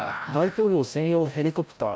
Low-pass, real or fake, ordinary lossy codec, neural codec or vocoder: none; fake; none; codec, 16 kHz, 0.5 kbps, FreqCodec, larger model